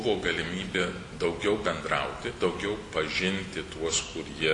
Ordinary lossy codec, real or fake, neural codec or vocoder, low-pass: AAC, 48 kbps; real; none; 10.8 kHz